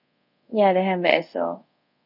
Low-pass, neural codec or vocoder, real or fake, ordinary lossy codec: 5.4 kHz; codec, 24 kHz, 0.5 kbps, DualCodec; fake; none